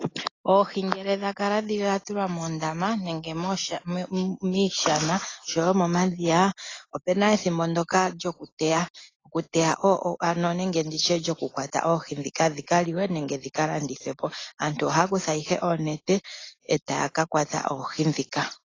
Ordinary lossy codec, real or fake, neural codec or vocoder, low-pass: AAC, 32 kbps; real; none; 7.2 kHz